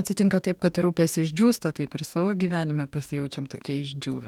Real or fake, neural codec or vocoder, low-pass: fake; codec, 44.1 kHz, 2.6 kbps, DAC; 19.8 kHz